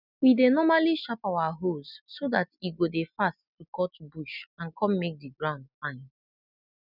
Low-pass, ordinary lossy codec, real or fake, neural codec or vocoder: 5.4 kHz; none; real; none